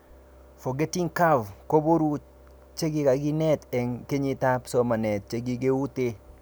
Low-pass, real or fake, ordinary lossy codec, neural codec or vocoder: none; real; none; none